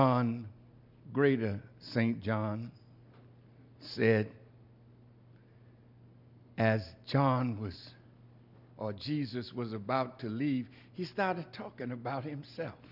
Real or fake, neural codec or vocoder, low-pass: real; none; 5.4 kHz